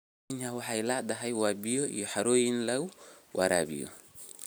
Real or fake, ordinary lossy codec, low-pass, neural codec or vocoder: real; none; none; none